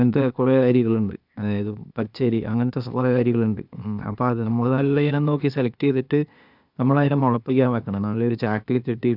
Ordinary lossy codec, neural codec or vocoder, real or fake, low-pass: none; codec, 16 kHz, 0.8 kbps, ZipCodec; fake; 5.4 kHz